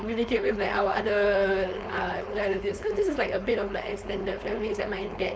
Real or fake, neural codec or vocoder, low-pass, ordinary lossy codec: fake; codec, 16 kHz, 4.8 kbps, FACodec; none; none